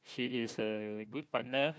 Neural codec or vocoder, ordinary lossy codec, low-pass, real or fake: codec, 16 kHz, 1 kbps, FunCodec, trained on Chinese and English, 50 frames a second; none; none; fake